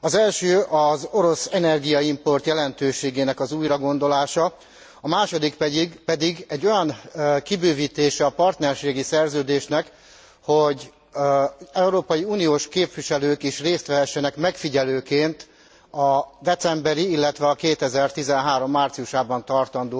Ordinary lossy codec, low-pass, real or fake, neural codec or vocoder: none; none; real; none